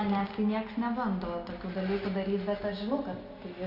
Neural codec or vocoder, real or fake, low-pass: none; real; 5.4 kHz